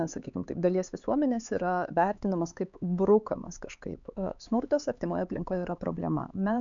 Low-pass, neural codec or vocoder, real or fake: 7.2 kHz; codec, 16 kHz, 4 kbps, X-Codec, HuBERT features, trained on LibriSpeech; fake